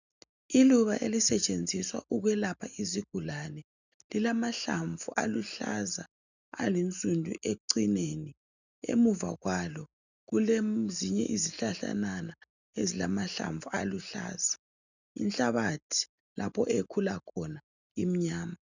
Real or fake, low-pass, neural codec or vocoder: real; 7.2 kHz; none